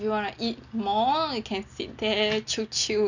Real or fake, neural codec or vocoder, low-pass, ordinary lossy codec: real; none; 7.2 kHz; none